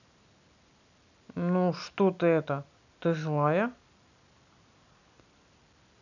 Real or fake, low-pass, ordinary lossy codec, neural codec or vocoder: real; 7.2 kHz; none; none